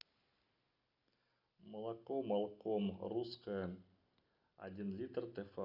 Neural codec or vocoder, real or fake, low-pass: none; real; 5.4 kHz